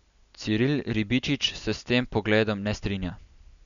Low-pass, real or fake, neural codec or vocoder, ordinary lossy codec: 7.2 kHz; real; none; none